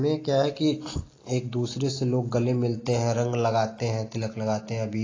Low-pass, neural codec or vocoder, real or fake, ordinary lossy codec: 7.2 kHz; none; real; AAC, 32 kbps